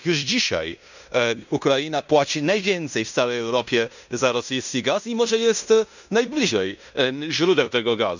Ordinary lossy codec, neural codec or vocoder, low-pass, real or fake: none; codec, 16 kHz in and 24 kHz out, 0.9 kbps, LongCat-Audio-Codec, fine tuned four codebook decoder; 7.2 kHz; fake